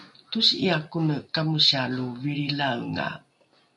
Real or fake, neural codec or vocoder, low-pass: real; none; 10.8 kHz